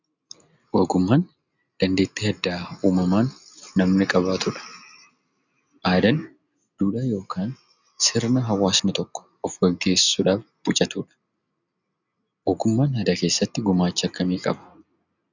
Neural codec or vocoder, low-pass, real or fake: none; 7.2 kHz; real